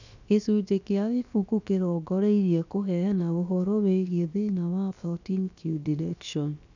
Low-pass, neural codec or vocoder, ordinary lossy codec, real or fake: 7.2 kHz; codec, 16 kHz, about 1 kbps, DyCAST, with the encoder's durations; none; fake